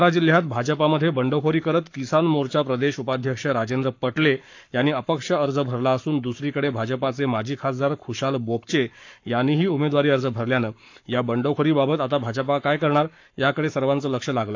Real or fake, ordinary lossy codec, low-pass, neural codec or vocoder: fake; AAC, 48 kbps; 7.2 kHz; codec, 44.1 kHz, 7.8 kbps, Pupu-Codec